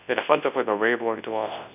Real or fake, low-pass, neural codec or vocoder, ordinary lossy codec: fake; 3.6 kHz; codec, 24 kHz, 0.9 kbps, WavTokenizer, large speech release; none